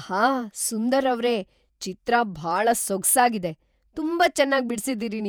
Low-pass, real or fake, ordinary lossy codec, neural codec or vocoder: none; fake; none; vocoder, 48 kHz, 128 mel bands, Vocos